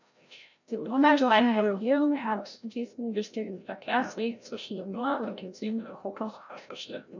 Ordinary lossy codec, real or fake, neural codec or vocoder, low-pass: none; fake; codec, 16 kHz, 0.5 kbps, FreqCodec, larger model; 7.2 kHz